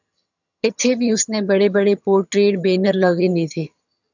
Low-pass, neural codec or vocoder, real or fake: 7.2 kHz; vocoder, 22.05 kHz, 80 mel bands, HiFi-GAN; fake